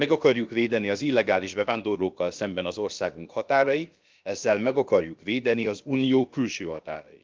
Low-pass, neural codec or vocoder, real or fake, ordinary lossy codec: 7.2 kHz; codec, 16 kHz, about 1 kbps, DyCAST, with the encoder's durations; fake; Opus, 32 kbps